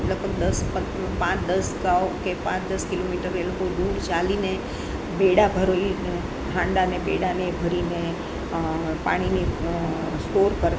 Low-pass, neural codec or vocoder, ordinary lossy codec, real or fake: none; none; none; real